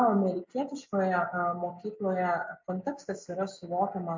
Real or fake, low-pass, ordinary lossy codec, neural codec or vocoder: real; 7.2 kHz; MP3, 64 kbps; none